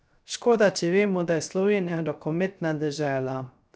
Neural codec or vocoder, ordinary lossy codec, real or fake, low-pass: codec, 16 kHz, 0.3 kbps, FocalCodec; none; fake; none